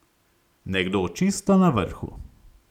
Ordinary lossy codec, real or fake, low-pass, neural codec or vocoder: none; real; 19.8 kHz; none